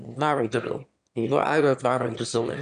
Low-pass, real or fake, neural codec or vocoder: 9.9 kHz; fake; autoencoder, 22.05 kHz, a latent of 192 numbers a frame, VITS, trained on one speaker